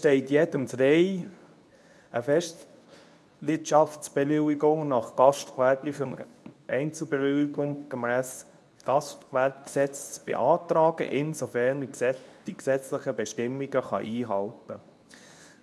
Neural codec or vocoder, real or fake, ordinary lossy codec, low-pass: codec, 24 kHz, 0.9 kbps, WavTokenizer, medium speech release version 2; fake; none; none